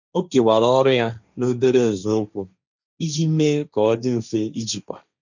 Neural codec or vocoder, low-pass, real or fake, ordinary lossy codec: codec, 16 kHz, 1.1 kbps, Voila-Tokenizer; 7.2 kHz; fake; none